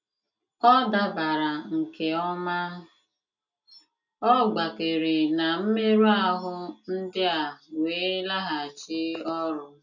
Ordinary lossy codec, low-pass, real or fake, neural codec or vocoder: none; 7.2 kHz; real; none